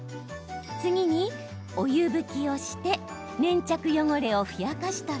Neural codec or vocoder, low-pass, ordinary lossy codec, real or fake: none; none; none; real